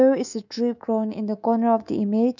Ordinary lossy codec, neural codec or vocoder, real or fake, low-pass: none; codec, 24 kHz, 3.1 kbps, DualCodec; fake; 7.2 kHz